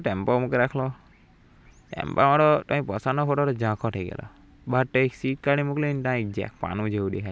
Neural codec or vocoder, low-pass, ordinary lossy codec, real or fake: none; none; none; real